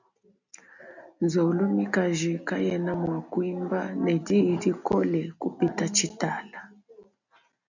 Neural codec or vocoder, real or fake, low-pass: none; real; 7.2 kHz